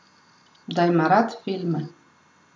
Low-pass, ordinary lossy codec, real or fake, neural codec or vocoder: none; none; real; none